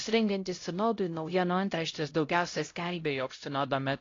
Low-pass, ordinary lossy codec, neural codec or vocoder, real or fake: 7.2 kHz; AAC, 32 kbps; codec, 16 kHz, 0.5 kbps, X-Codec, HuBERT features, trained on LibriSpeech; fake